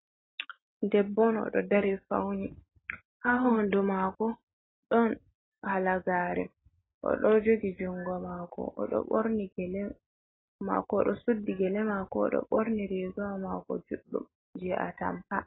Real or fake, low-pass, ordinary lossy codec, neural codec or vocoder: real; 7.2 kHz; AAC, 16 kbps; none